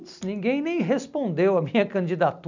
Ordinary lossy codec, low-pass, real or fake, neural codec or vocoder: none; 7.2 kHz; real; none